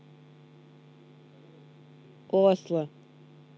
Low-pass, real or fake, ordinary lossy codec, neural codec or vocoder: none; real; none; none